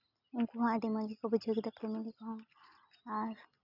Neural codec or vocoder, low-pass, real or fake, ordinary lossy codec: none; 5.4 kHz; real; none